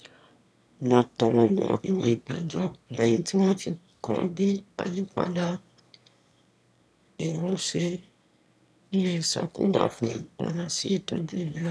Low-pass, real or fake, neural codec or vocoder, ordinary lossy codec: none; fake; autoencoder, 22.05 kHz, a latent of 192 numbers a frame, VITS, trained on one speaker; none